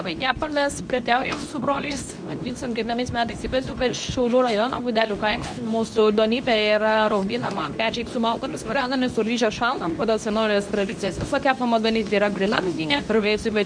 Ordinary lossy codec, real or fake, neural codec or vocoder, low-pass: MP3, 96 kbps; fake; codec, 24 kHz, 0.9 kbps, WavTokenizer, medium speech release version 2; 9.9 kHz